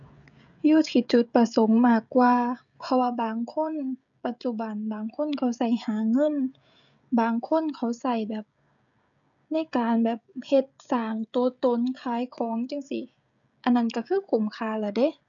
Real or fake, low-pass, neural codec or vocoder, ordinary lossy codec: fake; 7.2 kHz; codec, 16 kHz, 16 kbps, FreqCodec, smaller model; none